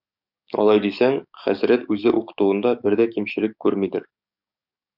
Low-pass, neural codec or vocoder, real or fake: 5.4 kHz; codec, 44.1 kHz, 7.8 kbps, DAC; fake